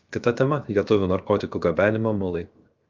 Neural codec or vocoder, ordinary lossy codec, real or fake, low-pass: codec, 16 kHz, 0.7 kbps, FocalCodec; Opus, 24 kbps; fake; 7.2 kHz